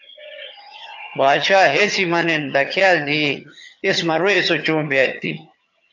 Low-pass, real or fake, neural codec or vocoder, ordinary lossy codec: 7.2 kHz; fake; vocoder, 22.05 kHz, 80 mel bands, HiFi-GAN; AAC, 48 kbps